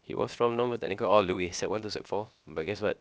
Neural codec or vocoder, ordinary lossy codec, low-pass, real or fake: codec, 16 kHz, about 1 kbps, DyCAST, with the encoder's durations; none; none; fake